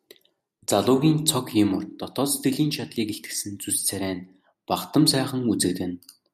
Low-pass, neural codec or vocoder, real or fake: 14.4 kHz; none; real